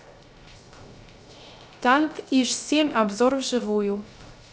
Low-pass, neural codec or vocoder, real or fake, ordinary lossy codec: none; codec, 16 kHz, 0.3 kbps, FocalCodec; fake; none